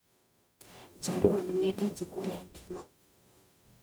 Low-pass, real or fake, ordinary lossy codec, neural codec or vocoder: none; fake; none; codec, 44.1 kHz, 0.9 kbps, DAC